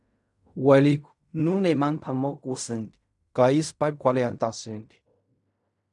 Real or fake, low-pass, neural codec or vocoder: fake; 10.8 kHz; codec, 16 kHz in and 24 kHz out, 0.4 kbps, LongCat-Audio-Codec, fine tuned four codebook decoder